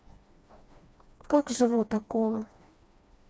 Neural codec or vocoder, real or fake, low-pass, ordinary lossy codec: codec, 16 kHz, 2 kbps, FreqCodec, smaller model; fake; none; none